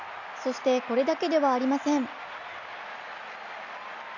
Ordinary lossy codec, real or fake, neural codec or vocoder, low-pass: none; real; none; 7.2 kHz